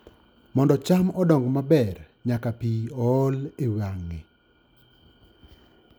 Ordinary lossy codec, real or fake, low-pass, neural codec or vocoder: none; real; none; none